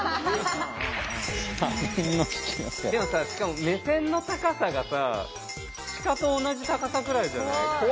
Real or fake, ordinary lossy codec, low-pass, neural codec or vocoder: real; none; none; none